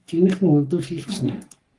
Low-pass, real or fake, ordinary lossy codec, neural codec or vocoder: 10.8 kHz; fake; Opus, 24 kbps; codec, 44.1 kHz, 3.4 kbps, Pupu-Codec